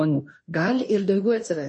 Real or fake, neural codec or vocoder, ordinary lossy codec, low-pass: fake; codec, 16 kHz in and 24 kHz out, 0.9 kbps, LongCat-Audio-Codec, fine tuned four codebook decoder; MP3, 32 kbps; 10.8 kHz